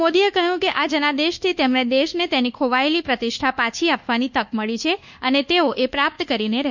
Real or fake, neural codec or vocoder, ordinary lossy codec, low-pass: fake; codec, 24 kHz, 1.2 kbps, DualCodec; none; 7.2 kHz